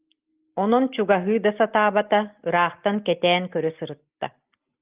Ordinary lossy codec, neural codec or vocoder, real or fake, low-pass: Opus, 24 kbps; none; real; 3.6 kHz